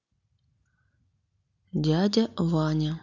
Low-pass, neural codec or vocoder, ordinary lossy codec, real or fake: 7.2 kHz; none; none; real